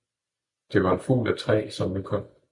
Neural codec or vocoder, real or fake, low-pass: none; real; 10.8 kHz